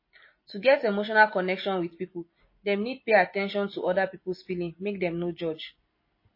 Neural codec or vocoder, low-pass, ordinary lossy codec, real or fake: none; 5.4 kHz; MP3, 24 kbps; real